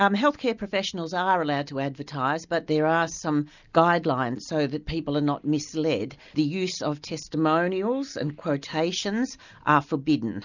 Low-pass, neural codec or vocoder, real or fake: 7.2 kHz; none; real